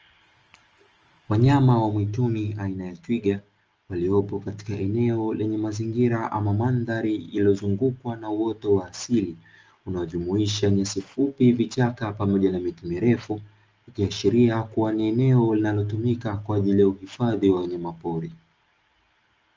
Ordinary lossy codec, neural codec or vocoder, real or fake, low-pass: Opus, 24 kbps; none; real; 7.2 kHz